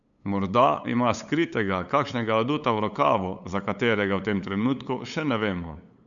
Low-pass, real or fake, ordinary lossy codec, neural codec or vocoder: 7.2 kHz; fake; none; codec, 16 kHz, 8 kbps, FunCodec, trained on LibriTTS, 25 frames a second